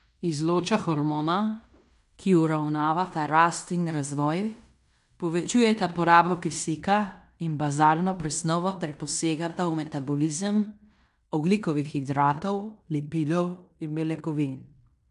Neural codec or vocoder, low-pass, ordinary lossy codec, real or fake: codec, 16 kHz in and 24 kHz out, 0.9 kbps, LongCat-Audio-Codec, fine tuned four codebook decoder; 10.8 kHz; none; fake